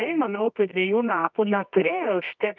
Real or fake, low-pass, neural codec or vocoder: fake; 7.2 kHz; codec, 24 kHz, 0.9 kbps, WavTokenizer, medium music audio release